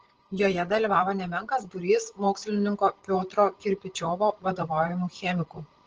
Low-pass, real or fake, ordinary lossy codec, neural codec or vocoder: 7.2 kHz; fake; Opus, 16 kbps; codec, 16 kHz, 16 kbps, FreqCodec, larger model